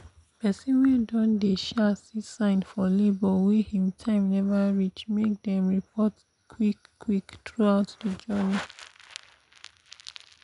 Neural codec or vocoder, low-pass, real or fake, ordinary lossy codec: none; 10.8 kHz; real; none